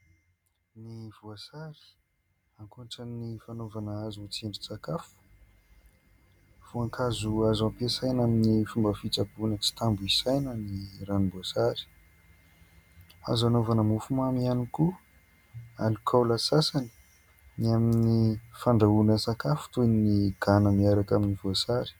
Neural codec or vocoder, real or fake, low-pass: none; real; 19.8 kHz